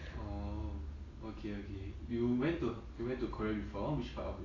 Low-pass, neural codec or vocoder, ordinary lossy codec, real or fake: 7.2 kHz; none; AAC, 32 kbps; real